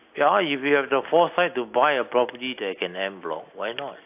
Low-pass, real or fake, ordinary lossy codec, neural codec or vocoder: 3.6 kHz; real; AAC, 32 kbps; none